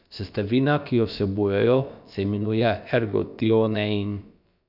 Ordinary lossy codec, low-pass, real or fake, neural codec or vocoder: none; 5.4 kHz; fake; codec, 16 kHz, about 1 kbps, DyCAST, with the encoder's durations